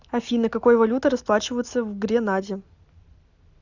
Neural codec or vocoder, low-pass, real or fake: none; 7.2 kHz; real